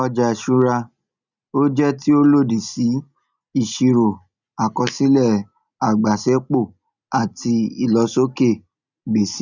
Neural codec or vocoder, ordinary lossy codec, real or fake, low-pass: none; none; real; 7.2 kHz